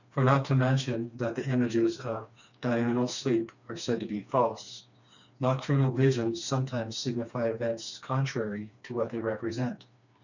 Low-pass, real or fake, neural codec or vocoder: 7.2 kHz; fake; codec, 16 kHz, 2 kbps, FreqCodec, smaller model